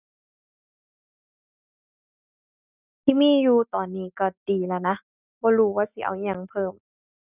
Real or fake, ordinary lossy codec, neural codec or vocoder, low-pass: real; none; none; 3.6 kHz